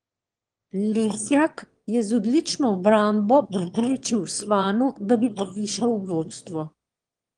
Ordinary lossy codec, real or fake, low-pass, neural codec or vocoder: Opus, 24 kbps; fake; 9.9 kHz; autoencoder, 22.05 kHz, a latent of 192 numbers a frame, VITS, trained on one speaker